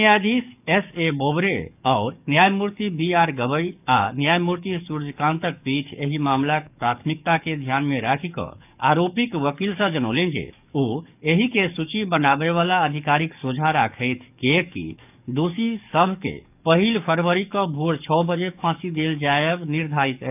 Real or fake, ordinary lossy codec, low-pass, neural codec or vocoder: fake; none; 3.6 kHz; codec, 44.1 kHz, 7.8 kbps, DAC